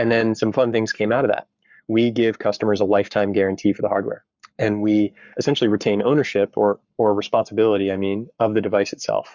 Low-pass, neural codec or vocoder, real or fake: 7.2 kHz; codec, 44.1 kHz, 7.8 kbps, Pupu-Codec; fake